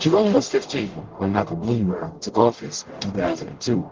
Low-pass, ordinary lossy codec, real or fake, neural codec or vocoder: 7.2 kHz; Opus, 16 kbps; fake; codec, 44.1 kHz, 0.9 kbps, DAC